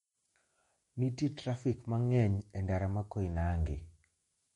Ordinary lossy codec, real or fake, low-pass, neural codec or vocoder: MP3, 48 kbps; fake; 19.8 kHz; autoencoder, 48 kHz, 128 numbers a frame, DAC-VAE, trained on Japanese speech